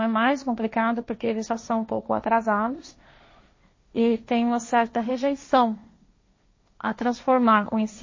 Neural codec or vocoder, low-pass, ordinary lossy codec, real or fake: codec, 16 kHz, 1.1 kbps, Voila-Tokenizer; 7.2 kHz; MP3, 32 kbps; fake